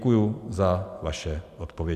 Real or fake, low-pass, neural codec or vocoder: real; 14.4 kHz; none